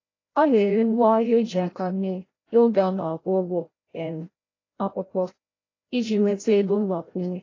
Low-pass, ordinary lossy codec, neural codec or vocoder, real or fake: 7.2 kHz; AAC, 32 kbps; codec, 16 kHz, 0.5 kbps, FreqCodec, larger model; fake